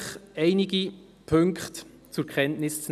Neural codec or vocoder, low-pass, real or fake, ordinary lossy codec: none; 14.4 kHz; real; none